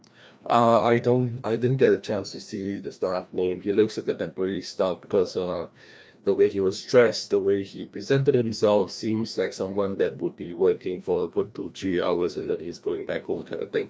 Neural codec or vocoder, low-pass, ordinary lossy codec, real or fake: codec, 16 kHz, 1 kbps, FreqCodec, larger model; none; none; fake